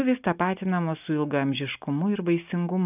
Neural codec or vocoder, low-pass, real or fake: none; 3.6 kHz; real